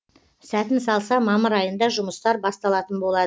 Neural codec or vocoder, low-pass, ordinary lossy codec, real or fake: none; none; none; real